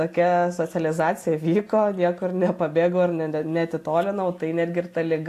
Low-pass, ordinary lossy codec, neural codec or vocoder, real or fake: 14.4 kHz; AAC, 64 kbps; none; real